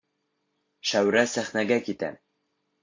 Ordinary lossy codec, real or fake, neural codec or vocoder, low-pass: MP3, 48 kbps; real; none; 7.2 kHz